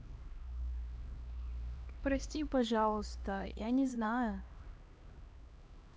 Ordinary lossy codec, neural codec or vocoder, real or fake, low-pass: none; codec, 16 kHz, 2 kbps, X-Codec, HuBERT features, trained on LibriSpeech; fake; none